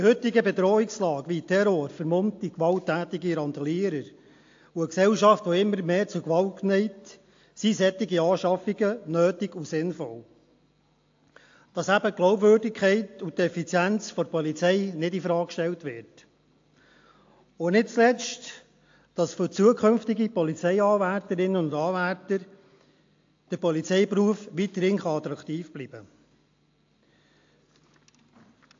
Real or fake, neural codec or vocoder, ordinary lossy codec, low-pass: real; none; AAC, 48 kbps; 7.2 kHz